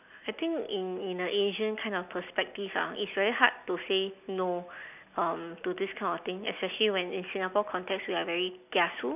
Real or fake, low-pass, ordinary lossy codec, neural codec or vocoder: real; 3.6 kHz; none; none